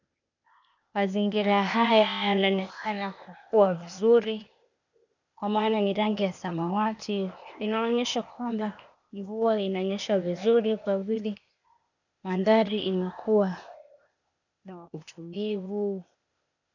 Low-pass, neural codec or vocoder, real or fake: 7.2 kHz; codec, 16 kHz, 0.8 kbps, ZipCodec; fake